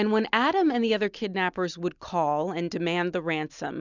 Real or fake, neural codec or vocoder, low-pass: real; none; 7.2 kHz